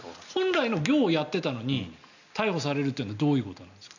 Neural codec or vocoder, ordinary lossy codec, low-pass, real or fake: none; none; 7.2 kHz; real